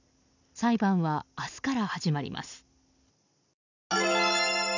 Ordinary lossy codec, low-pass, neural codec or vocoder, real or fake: none; 7.2 kHz; none; real